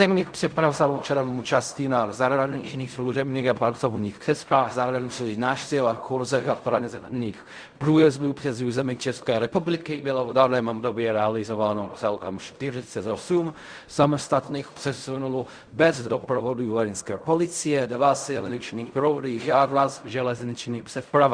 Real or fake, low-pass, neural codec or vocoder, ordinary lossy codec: fake; 9.9 kHz; codec, 16 kHz in and 24 kHz out, 0.4 kbps, LongCat-Audio-Codec, fine tuned four codebook decoder; Opus, 64 kbps